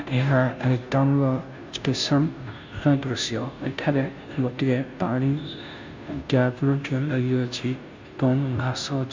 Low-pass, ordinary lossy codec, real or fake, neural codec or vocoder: 7.2 kHz; MP3, 64 kbps; fake; codec, 16 kHz, 0.5 kbps, FunCodec, trained on Chinese and English, 25 frames a second